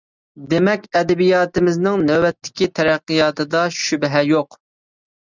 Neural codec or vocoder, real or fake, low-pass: none; real; 7.2 kHz